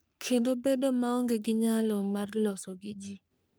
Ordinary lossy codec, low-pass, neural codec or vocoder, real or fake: none; none; codec, 44.1 kHz, 3.4 kbps, Pupu-Codec; fake